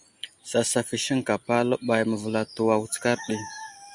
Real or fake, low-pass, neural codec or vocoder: real; 10.8 kHz; none